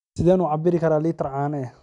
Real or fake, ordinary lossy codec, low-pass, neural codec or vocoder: real; none; 10.8 kHz; none